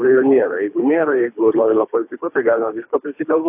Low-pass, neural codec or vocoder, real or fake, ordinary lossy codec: 3.6 kHz; codec, 24 kHz, 3 kbps, HILCodec; fake; AAC, 32 kbps